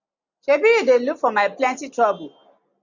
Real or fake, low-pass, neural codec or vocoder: real; 7.2 kHz; none